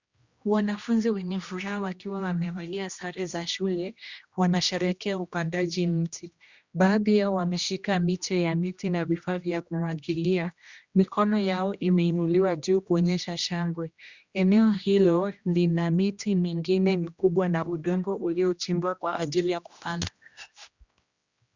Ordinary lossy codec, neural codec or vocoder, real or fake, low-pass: Opus, 64 kbps; codec, 16 kHz, 1 kbps, X-Codec, HuBERT features, trained on general audio; fake; 7.2 kHz